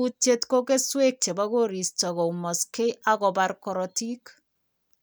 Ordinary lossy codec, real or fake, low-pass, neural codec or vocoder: none; real; none; none